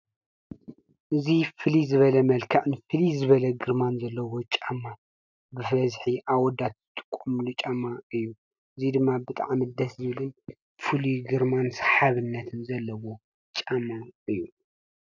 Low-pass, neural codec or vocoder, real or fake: 7.2 kHz; none; real